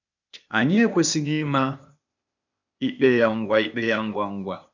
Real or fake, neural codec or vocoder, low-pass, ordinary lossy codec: fake; codec, 16 kHz, 0.8 kbps, ZipCodec; 7.2 kHz; none